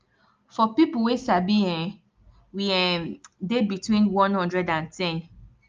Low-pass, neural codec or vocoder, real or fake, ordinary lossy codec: 7.2 kHz; none; real; Opus, 24 kbps